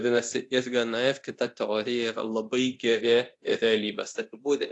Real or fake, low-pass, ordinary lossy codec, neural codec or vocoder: fake; 10.8 kHz; AAC, 48 kbps; codec, 24 kHz, 0.9 kbps, DualCodec